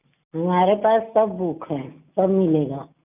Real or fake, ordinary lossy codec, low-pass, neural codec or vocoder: real; none; 3.6 kHz; none